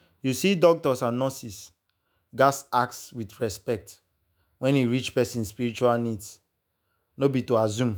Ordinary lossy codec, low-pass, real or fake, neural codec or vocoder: none; none; fake; autoencoder, 48 kHz, 128 numbers a frame, DAC-VAE, trained on Japanese speech